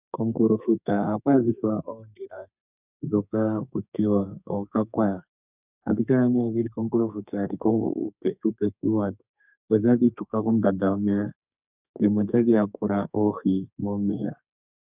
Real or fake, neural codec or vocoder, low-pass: fake; codec, 32 kHz, 1.9 kbps, SNAC; 3.6 kHz